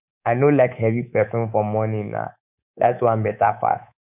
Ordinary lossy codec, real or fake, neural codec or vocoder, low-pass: none; fake; codec, 24 kHz, 3.1 kbps, DualCodec; 3.6 kHz